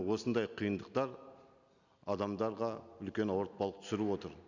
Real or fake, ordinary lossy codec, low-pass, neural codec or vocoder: real; none; 7.2 kHz; none